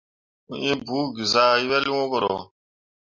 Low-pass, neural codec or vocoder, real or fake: 7.2 kHz; none; real